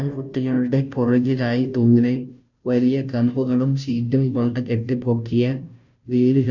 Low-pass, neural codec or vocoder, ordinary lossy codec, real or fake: 7.2 kHz; codec, 16 kHz, 0.5 kbps, FunCodec, trained on Chinese and English, 25 frames a second; none; fake